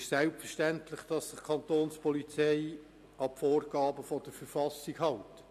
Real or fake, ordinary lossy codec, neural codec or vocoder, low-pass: real; AAC, 96 kbps; none; 14.4 kHz